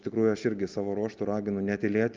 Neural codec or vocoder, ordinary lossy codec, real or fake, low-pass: none; Opus, 24 kbps; real; 7.2 kHz